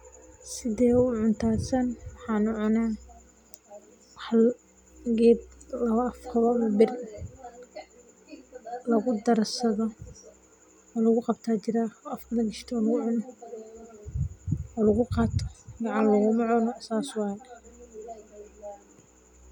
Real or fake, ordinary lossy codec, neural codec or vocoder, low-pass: real; none; none; 19.8 kHz